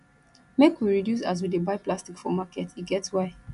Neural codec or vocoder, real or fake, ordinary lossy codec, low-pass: none; real; none; 10.8 kHz